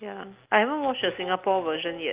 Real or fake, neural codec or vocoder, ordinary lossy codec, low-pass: real; none; Opus, 32 kbps; 3.6 kHz